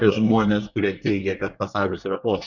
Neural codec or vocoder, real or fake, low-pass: codec, 24 kHz, 1 kbps, SNAC; fake; 7.2 kHz